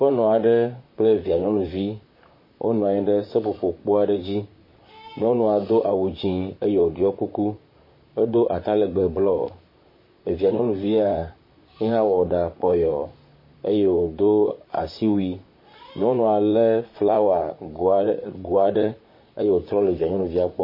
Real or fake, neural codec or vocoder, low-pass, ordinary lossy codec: fake; vocoder, 44.1 kHz, 128 mel bands, Pupu-Vocoder; 5.4 kHz; MP3, 24 kbps